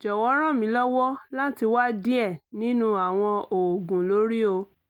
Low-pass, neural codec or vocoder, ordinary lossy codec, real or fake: 19.8 kHz; none; Opus, 32 kbps; real